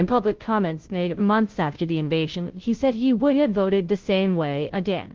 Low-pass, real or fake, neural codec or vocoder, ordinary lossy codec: 7.2 kHz; fake; codec, 16 kHz, 0.5 kbps, FunCodec, trained on Chinese and English, 25 frames a second; Opus, 16 kbps